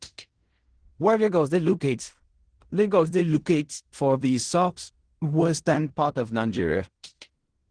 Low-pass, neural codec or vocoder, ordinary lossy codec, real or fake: 9.9 kHz; codec, 16 kHz in and 24 kHz out, 0.4 kbps, LongCat-Audio-Codec, fine tuned four codebook decoder; Opus, 16 kbps; fake